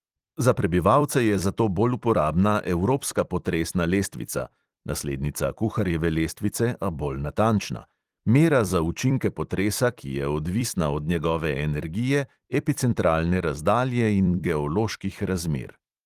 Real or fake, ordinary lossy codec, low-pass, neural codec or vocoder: fake; Opus, 24 kbps; 14.4 kHz; vocoder, 44.1 kHz, 128 mel bands every 256 samples, BigVGAN v2